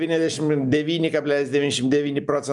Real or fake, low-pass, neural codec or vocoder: real; 10.8 kHz; none